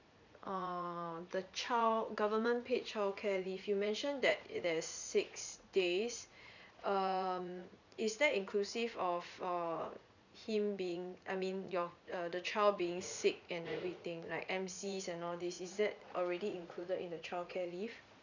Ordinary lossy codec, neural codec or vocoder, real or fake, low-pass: none; vocoder, 44.1 kHz, 80 mel bands, Vocos; fake; 7.2 kHz